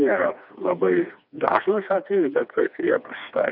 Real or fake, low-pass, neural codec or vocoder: fake; 5.4 kHz; codec, 16 kHz, 2 kbps, FreqCodec, smaller model